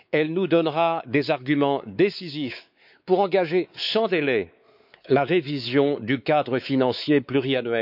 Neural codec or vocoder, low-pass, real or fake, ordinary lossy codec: codec, 16 kHz, 4 kbps, X-Codec, WavLM features, trained on Multilingual LibriSpeech; 5.4 kHz; fake; none